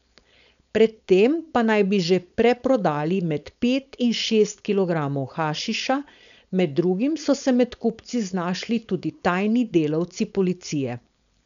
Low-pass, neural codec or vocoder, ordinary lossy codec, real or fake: 7.2 kHz; codec, 16 kHz, 4.8 kbps, FACodec; none; fake